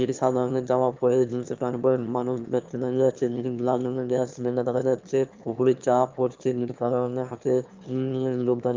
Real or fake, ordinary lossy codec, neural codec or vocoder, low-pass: fake; Opus, 24 kbps; autoencoder, 22.05 kHz, a latent of 192 numbers a frame, VITS, trained on one speaker; 7.2 kHz